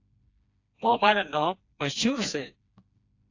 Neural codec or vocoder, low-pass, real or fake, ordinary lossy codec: codec, 16 kHz, 2 kbps, FreqCodec, smaller model; 7.2 kHz; fake; AAC, 48 kbps